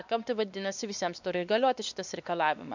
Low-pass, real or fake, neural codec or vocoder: 7.2 kHz; fake; codec, 16 kHz, 2 kbps, X-Codec, WavLM features, trained on Multilingual LibriSpeech